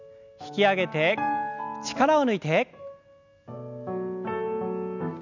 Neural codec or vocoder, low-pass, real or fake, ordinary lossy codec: none; 7.2 kHz; real; none